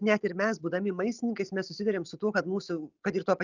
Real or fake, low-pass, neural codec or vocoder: real; 7.2 kHz; none